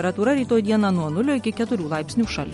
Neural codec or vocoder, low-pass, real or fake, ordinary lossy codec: none; 19.8 kHz; real; MP3, 48 kbps